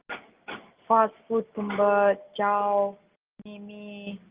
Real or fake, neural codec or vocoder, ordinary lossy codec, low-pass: real; none; Opus, 32 kbps; 3.6 kHz